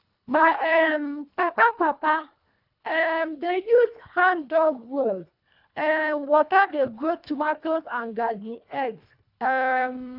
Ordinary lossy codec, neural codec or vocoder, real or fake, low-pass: none; codec, 24 kHz, 1.5 kbps, HILCodec; fake; 5.4 kHz